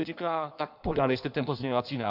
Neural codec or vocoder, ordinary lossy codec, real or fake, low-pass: codec, 16 kHz in and 24 kHz out, 1.1 kbps, FireRedTTS-2 codec; AAC, 48 kbps; fake; 5.4 kHz